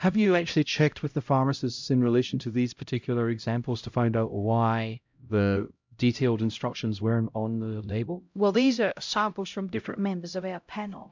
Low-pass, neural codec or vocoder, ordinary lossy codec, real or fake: 7.2 kHz; codec, 16 kHz, 0.5 kbps, X-Codec, HuBERT features, trained on LibriSpeech; MP3, 64 kbps; fake